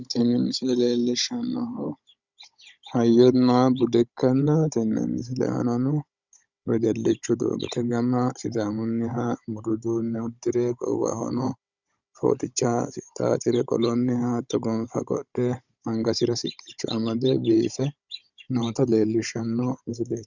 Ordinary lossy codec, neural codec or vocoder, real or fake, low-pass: Opus, 64 kbps; codec, 16 kHz, 16 kbps, FunCodec, trained on Chinese and English, 50 frames a second; fake; 7.2 kHz